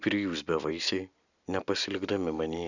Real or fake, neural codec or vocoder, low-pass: real; none; 7.2 kHz